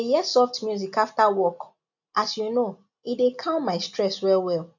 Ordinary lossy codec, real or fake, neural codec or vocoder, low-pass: none; real; none; 7.2 kHz